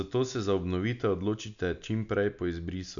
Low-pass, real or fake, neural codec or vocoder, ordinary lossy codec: 7.2 kHz; real; none; none